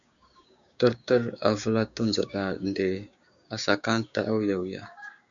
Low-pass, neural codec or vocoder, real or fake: 7.2 kHz; codec, 16 kHz, 6 kbps, DAC; fake